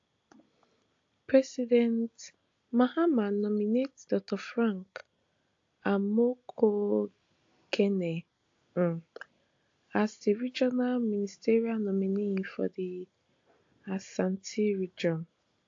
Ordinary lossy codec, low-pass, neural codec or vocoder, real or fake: AAC, 48 kbps; 7.2 kHz; none; real